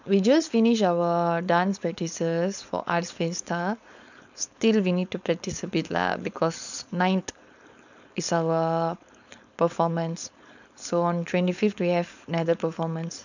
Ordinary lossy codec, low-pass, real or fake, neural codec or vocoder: none; 7.2 kHz; fake; codec, 16 kHz, 4.8 kbps, FACodec